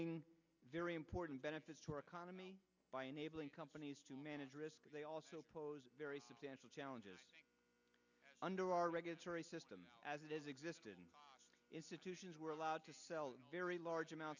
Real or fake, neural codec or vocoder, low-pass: real; none; 7.2 kHz